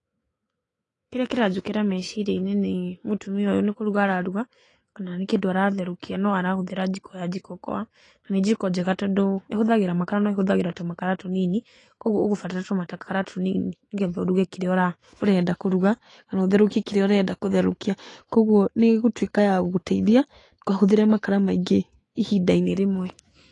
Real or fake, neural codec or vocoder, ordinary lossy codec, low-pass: fake; autoencoder, 48 kHz, 128 numbers a frame, DAC-VAE, trained on Japanese speech; AAC, 32 kbps; 10.8 kHz